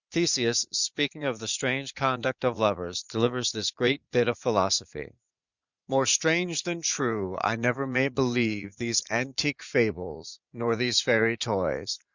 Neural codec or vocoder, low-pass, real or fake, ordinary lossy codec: vocoder, 44.1 kHz, 80 mel bands, Vocos; 7.2 kHz; fake; Opus, 64 kbps